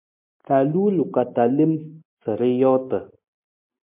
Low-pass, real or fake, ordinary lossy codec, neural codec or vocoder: 3.6 kHz; real; MP3, 32 kbps; none